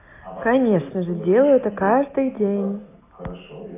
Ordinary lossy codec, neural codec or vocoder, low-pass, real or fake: AAC, 24 kbps; none; 3.6 kHz; real